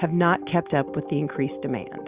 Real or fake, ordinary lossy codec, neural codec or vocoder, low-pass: real; Opus, 64 kbps; none; 3.6 kHz